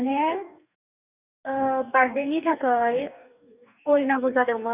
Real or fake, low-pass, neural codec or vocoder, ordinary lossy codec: fake; 3.6 kHz; codec, 44.1 kHz, 2.6 kbps, DAC; none